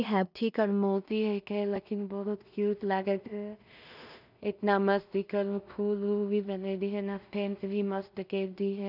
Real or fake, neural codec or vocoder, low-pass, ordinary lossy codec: fake; codec, 16 kHz in and 24 kHz out, 0.4 kbps, LongCat-Audio-Codec, two codebook decoder; 5.4 kHz; none